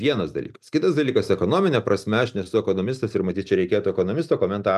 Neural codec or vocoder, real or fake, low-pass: none; real; 14.4 kHz